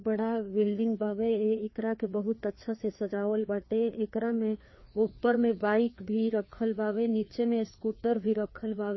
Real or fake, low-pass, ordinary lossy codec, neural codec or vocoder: fake; 7.2 kHz; MP3, 24 kbps; codec, 16 kHz, 2 kbps, FreqCodec, larger model